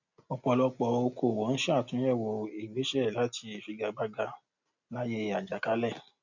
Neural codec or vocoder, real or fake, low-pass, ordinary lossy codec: none; real; 7.2 kHz; none